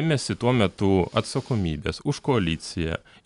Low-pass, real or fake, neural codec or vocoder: 10.8 kHz; real; none